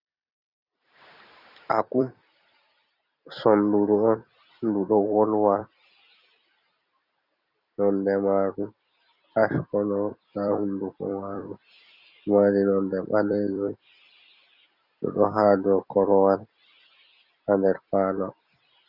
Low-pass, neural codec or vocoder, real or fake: 5.4 kHz; vocoder, 44.1 kHz, 128 mel bands every 256 samples, BigVGAN v2; fake